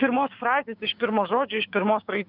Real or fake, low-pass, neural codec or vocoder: fake; 5.4 kHz; codec, 16 kHz, 6 kbps, DAC